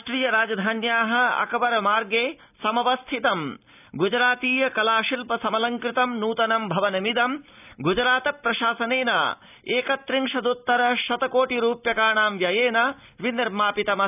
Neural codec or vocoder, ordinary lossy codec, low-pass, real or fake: none; none; 3.6 kHz; real